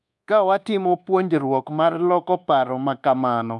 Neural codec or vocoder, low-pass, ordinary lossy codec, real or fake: codec, 24 kHz, 1.2 kbps, DualCodec; none; none; fake